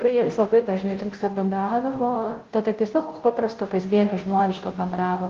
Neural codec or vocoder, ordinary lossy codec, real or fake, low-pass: codec, 16 kHz, 0.5 kbps, FunCodec, trained on Chinese and English, 25 frames a second; Opus, 16 kbps; fake; 7.2 kHz